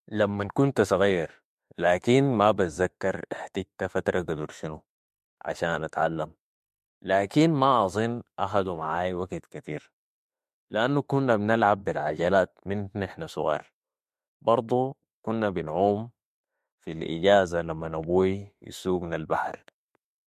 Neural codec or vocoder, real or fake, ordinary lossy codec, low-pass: autoencoder, 48 kHz, 32 numbers a frame, DAC-VAE, trained on Japanese speech; fake; MP3, 64 kbps; 14.4 kHz